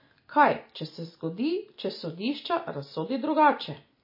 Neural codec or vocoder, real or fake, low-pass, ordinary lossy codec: none; real; 5.4 kHz; MP3, 24 kbps